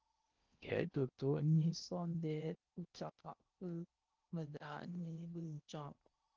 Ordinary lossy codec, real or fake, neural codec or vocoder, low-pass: Opus, 24 kbps; fake; codec, 16 kHz in and 24 kHz out, 0.8 kbps, FocalCodec, streaming, 65536 codes; 7.2 kHz